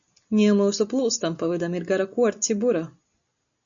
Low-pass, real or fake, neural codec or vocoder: 7.2 kHz; real; none